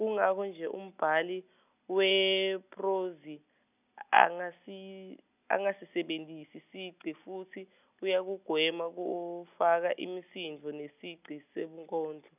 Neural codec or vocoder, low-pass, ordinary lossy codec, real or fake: none; 3.6 kHz; none; real